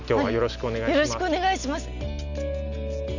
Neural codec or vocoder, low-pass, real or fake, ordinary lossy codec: none; 7.2 kHz; real; none